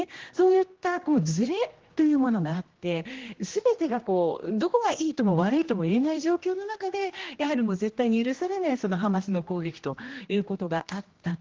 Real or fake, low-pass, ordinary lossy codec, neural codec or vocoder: fake; 7.2 kHz; Opus, 16 kbps; codec, 16 kHz, 1 kbps, X-Codec, HuBERT features, trained on general audio